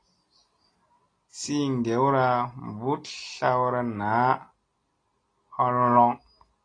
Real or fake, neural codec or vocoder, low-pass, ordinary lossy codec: real; none; 9.9 kHz; AAC, 32 kbps